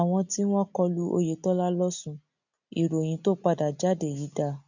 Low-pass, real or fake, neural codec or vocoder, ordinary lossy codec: 7.2 kHz; real; none; none